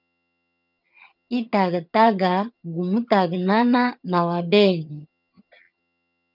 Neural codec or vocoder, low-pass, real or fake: vocoder, 22.05 kHz, 80 mel bands, HiFi-GAN; 5.4 kHz; fake